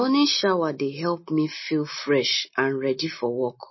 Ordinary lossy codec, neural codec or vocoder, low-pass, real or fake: MP3, 24 kbps; none; 7.2 kHz; real